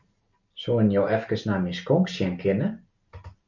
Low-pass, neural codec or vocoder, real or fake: 7.2 kHz; none; real